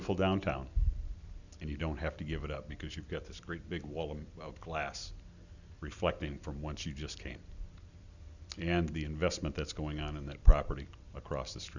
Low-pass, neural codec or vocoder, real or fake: 7.2 kHz; none; real